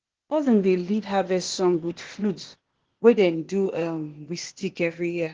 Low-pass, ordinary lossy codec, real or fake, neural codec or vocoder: 7.2 kHz; Opus, 16 kbps; fake; codec, 16 kHz, 0.8 kbps, ZipCodec